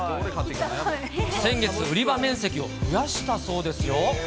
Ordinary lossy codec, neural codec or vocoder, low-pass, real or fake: none; none; none; real